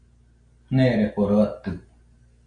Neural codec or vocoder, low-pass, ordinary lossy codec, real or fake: none; 9.9 kHz; AAC, 48 kbps; real